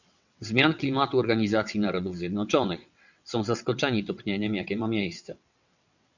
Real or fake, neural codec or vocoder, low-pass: fake; vocoder, 22.05 kHz, 80 mel bands, WaveNeXt; 7.2 kHz